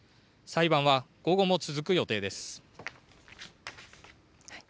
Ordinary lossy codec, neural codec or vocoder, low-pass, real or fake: none; none; none; real